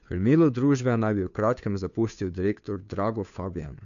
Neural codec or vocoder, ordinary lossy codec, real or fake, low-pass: codec, 16 kHz, 2 kbps, FunCodec, trained on Chinese and English, 25 frames a second; none; fake; 7.2 kHz